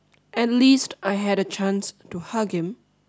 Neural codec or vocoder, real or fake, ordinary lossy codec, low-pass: none; real; none; none